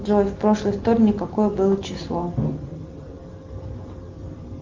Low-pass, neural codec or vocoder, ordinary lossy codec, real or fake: 7.2 kHz; none; Opus, 32 kbps; real